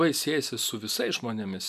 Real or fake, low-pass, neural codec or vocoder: real; 14.4 kHz; none